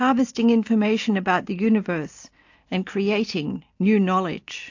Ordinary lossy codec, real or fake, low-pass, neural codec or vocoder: AAC, 48 kbps; real; 7.2 kHz; none